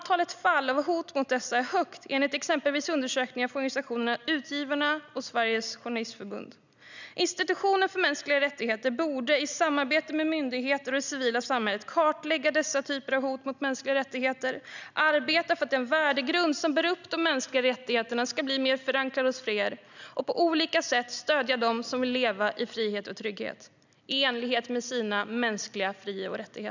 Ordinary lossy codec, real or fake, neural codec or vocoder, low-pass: none; real; none; 7.2 kHz